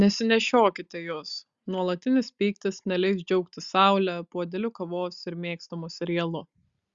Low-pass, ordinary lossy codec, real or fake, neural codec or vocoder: 7.2 kHz; Opus, 64 kbps; real; none